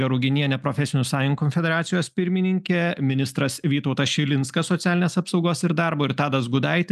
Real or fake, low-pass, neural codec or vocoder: real; 14.4 kHz; none